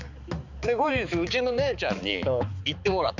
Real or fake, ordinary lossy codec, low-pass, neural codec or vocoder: fake; none; 7.2 kHz; codec, 16 kHz, 4 kbps, X-Codec, HuBERT features, trained on balanced general audio